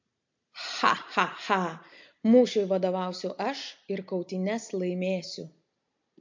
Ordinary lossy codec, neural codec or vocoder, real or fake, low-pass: MP3, 48 kbps; none; real; 7.2 kHz